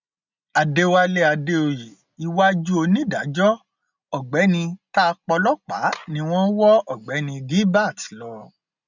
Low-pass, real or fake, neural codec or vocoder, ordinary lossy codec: 7.2 kHz; real; none; none